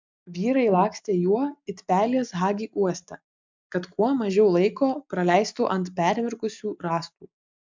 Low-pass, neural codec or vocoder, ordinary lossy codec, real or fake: 7.2 kHz; none; MP3, 64 kbps; real